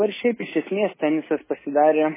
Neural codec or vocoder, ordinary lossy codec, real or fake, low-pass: none; MP3, 16 kbps; real; 3.6 kHz